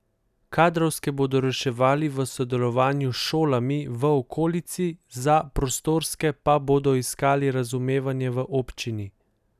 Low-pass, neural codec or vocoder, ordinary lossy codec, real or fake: 14.4 kHz; none; none; real